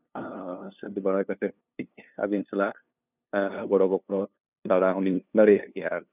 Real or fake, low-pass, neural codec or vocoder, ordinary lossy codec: fake; 3.6 kHz; codec, 16 kHz, 2 kbps, FunCodec, trained on LibriTTS, 25 frames a second; none